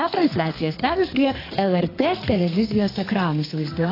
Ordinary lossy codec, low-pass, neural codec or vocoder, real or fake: AAC, 24 kbps; 5.4 kHz; codec, 32 kHz, 1.9 kbps, SNAC; fake